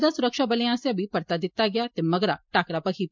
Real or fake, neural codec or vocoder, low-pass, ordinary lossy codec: real; none; 7.2 kHz; MP3, 64 kbps